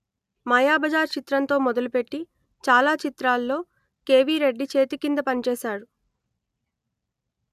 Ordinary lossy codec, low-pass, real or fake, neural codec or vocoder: none; 14.4 kHz; real; none